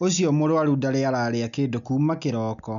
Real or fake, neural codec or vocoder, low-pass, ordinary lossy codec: real; none; 7.2 kHz; none